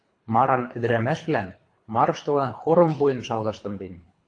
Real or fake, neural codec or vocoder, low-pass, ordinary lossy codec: fake; codec, 24 kHz, 3 kbps, HILCodec; 9.9 kHz; AAC, 48 kbps